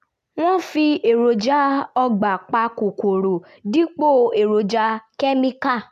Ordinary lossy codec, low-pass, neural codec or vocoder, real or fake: none; 14.4 kHz; none; real